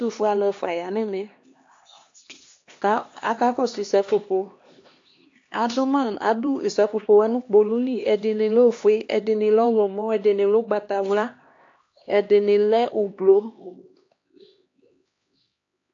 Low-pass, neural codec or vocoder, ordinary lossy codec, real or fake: 7.2 kHz; codec, 16 kHz, 2 kbps, X-Codec, HuBERT features, trained on LibriSpeech; AAC, 48 kbps; fake